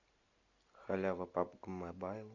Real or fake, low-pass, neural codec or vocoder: real; 7.2 kHz; none